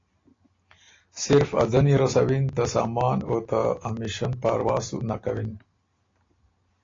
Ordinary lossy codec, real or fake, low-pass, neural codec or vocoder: AAC, 32 kbps; real; 7.2 kHz; none